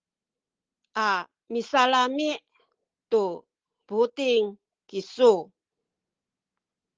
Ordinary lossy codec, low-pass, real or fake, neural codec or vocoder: Opus, 16 kbps; 7.2 kHz; real; none